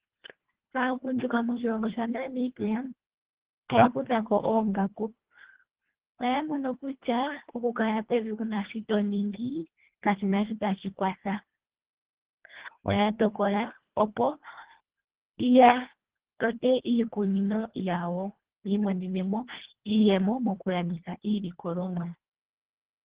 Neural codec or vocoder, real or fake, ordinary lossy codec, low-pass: codec, 24 kHz, 1.5 kbps, HILCodec; fake; Opus, 16 kbps; 3.6 kHz